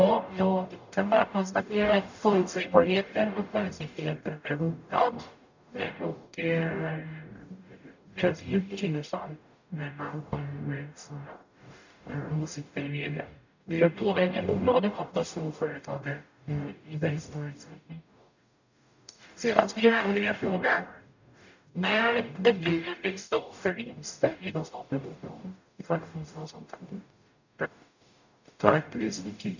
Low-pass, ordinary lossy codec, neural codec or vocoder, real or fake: 7.2 kHz; none; codec, 44.1 kHz, 0.9 kbps, DAC; fake